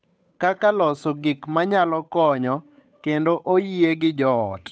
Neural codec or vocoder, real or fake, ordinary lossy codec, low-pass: codec, 16 kHz, 8 kbps, FunCodec, trained on Chinese and English, 25 frames a second; fake; none; none